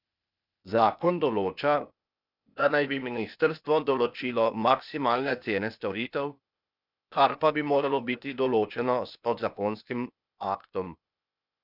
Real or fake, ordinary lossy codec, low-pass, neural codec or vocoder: fake; none; 5.4 kHz; codec, 16 kHz, 0.8 kbps, ZipCodec